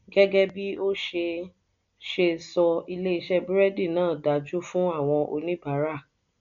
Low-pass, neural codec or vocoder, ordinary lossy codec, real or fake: 7.2 kHz; none; MP3, 64 kbps; real